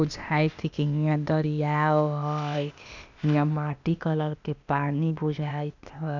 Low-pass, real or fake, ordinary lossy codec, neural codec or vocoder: 7.2 kHz; fake; none; codec, 16 kHz, 0.8 kbps, ZipCodec